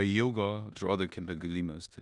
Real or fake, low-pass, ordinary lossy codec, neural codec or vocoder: fake; 10.8 kHz; Opus, 64 kbps; codec, 16 kHz in and 24 kHz out, 0.9 kbps, LongCat-Audio-Codec, four codebook decoder